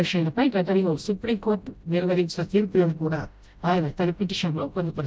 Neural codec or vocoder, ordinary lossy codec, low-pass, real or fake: codec, 16 kHz, 0.5 kbps, FreqCodec, smaller model; none; none; fake